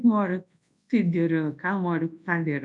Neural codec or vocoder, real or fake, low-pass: codec, 24 kHz, 0.9 kbps, WavTokenizer, large speech release; fake; 10.8 kHz